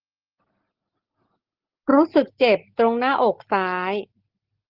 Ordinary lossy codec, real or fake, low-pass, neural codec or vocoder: Opus, 16 kbps; real; 5.4 kHz; none